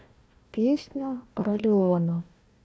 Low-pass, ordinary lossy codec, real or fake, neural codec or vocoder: none; none; fake; codec, 16 kHz, 1 kbps, FunCodec, trained on Chinese and English, 50 frames a second